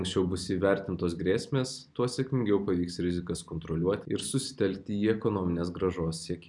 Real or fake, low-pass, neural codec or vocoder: real; 10.8 kHz; none